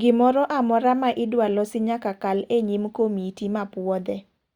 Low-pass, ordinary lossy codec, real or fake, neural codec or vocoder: 19.8 kHz; Opus, 64 kbps; real; none